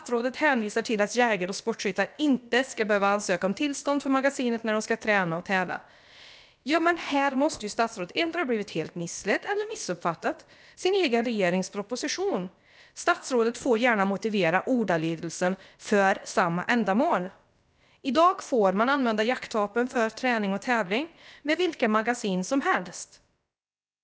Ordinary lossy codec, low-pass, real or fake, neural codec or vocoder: none; none; fake; codec, 16 kHz, about 1 kbps, DyCAST, with the encoder's durations